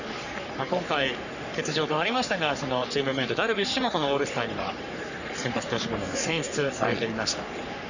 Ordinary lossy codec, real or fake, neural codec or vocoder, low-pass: none; fake; codec, 44.1 kHz, 3.4 kbps, Pupu-Codec; 7.2 kHz